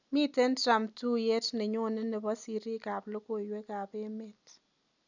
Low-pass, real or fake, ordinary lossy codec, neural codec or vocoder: 7.2 kHz; real; none; none